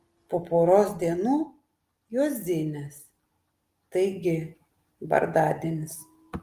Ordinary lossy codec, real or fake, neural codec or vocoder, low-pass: Opus, 24 kbps; real; none; 14.4 kHz